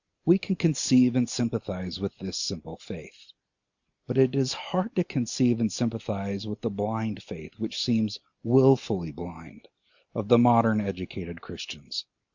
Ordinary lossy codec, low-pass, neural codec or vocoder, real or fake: Opus, 64 kbps; 7.2 kHz; none; real